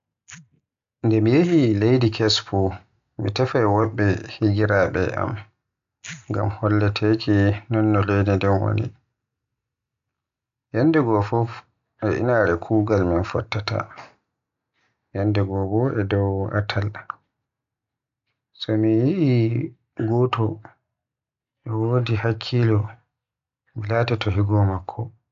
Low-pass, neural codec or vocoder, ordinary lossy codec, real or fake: 7.2 kHz; none; AAC, 64 kbps; real